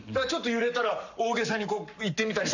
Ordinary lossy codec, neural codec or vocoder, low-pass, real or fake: none; codec, 44.1 kHz, 7.8 kbps, Pupu-Codec; 7.2 kHz; fake